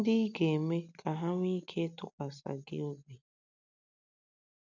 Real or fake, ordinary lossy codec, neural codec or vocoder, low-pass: real; none; none; 7.2 kHz